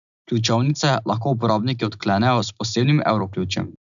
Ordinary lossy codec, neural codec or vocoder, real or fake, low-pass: none; none; real; 7.2 kHz